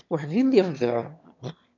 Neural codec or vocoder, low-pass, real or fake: autoencoder, 22.05 kHz, a latent of 192 numbers a frame, VITS, trained on one speaker; 7.2 kHz; fake